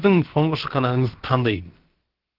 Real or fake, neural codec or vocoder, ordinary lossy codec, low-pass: fake; codec, 16 kHz, about 1 kbps, DyCAST, with the encoder's durations; Opus, 16 kbps; 5.4 kHz